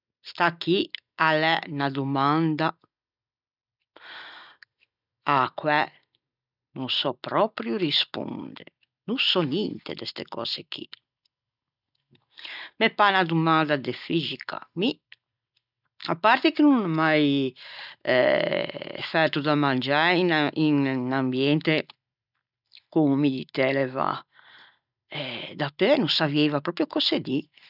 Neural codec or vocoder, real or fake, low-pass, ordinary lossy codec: none; real; 5.4 kHz; none